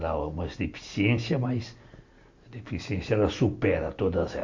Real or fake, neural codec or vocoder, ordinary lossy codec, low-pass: real; none; MP3, 64 kbps; 7.2 kHz